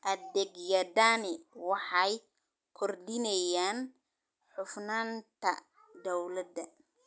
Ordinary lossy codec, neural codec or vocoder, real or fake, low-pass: none; none; real; none